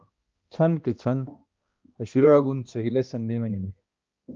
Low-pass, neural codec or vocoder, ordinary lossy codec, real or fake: 7.2 kHz; codec, 16 kHz, 1 kbps, X-Codec, HuBERT features, trained on balanced general audio; Opus, 24 kbps; fake